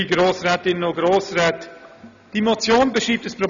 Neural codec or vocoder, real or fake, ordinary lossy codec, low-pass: none; real; none; 7.2 kHz